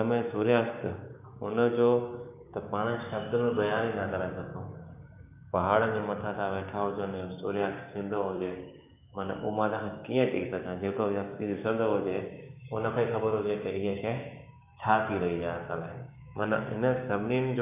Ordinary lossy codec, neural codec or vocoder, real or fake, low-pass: none; codec, 16 kHz, 6 kbps, DAC; fake; 3.6 kHz